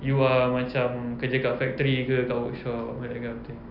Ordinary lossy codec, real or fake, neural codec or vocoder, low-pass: none; real; none; 5.4 kHz